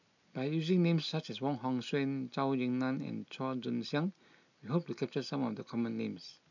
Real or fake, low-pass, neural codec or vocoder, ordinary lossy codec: real; 7.2 kHz; none; none